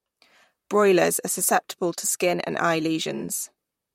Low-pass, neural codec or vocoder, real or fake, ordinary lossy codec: 19.8 kHz; vocoder, 44.1 kHz, 128 mel bands, Pupu-Vocoder; fake; MP3, 64 kbps